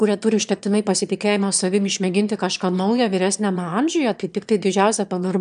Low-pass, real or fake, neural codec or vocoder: 9.9 kHz; fake; autoencoder, 22.05 kHz, a latent of 192 numbers a frame, VITS, trained on one speaker